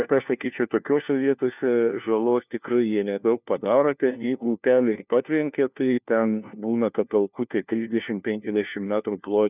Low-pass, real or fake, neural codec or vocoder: 3.6 kHz; fake; codec, 16 kHz, 1 kbps, FunCodec, trained on LibriTTS, 50 frames a second